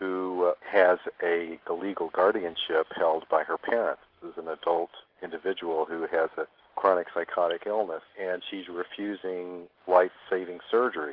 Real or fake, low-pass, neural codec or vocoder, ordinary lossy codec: real; 5.4 kHz; none; Opus, 16 kbps